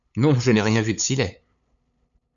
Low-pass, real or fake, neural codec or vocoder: 7.2 kHz; fake; codec, 16 kHz, 8 kbps, FunCodec, trained on LibriTTS, 25 frames a second